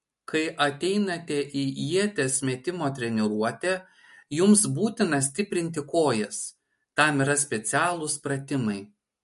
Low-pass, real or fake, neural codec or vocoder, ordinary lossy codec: 14.4 kHz; real; none; MP3, 48 kbps